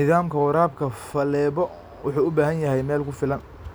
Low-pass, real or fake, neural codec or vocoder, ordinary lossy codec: none; real; none; none